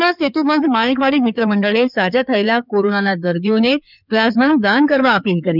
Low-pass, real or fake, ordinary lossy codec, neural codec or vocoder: 5.4 kHz; fake; none; codec, 16 kHz in and 24 kHz out, 2.2 kbps, FireRedTTS-2 codec